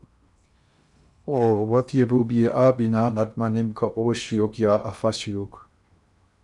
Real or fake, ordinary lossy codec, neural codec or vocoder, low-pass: fake; MP3, 96 kbps; codec, 16 kHz in and 24 kHz out, 0.8 kbps, FocalCodec, streaming, 65536 codes; 10.8 kHz